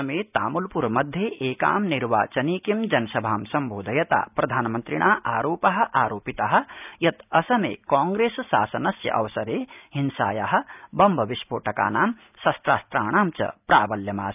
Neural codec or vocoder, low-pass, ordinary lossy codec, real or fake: none; 3.6 kHz; none; real